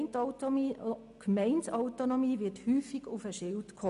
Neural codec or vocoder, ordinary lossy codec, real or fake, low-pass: none; MP3, 96 kbps; real; 10.8 kHz